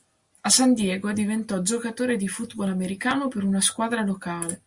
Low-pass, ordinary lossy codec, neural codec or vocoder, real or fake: 10.8 kHz; Opus, 64 kbps; none; real